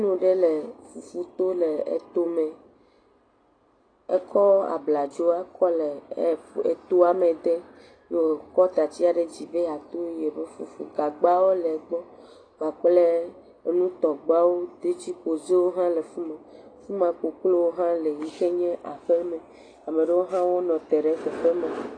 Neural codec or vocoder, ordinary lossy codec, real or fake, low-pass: none; AAC, 32 kbps; real; 9.9 kHz